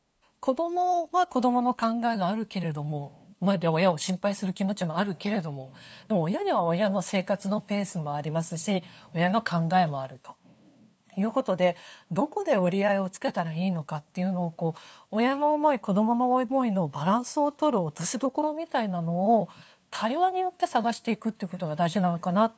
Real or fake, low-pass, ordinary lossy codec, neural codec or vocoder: fake; none; none; codec, 16 kHz, 2 kbps, FunCodec, trained on LibriTTS, 25 frames a second